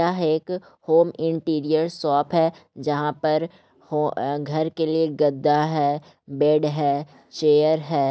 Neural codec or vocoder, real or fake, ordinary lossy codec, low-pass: none; real; none; none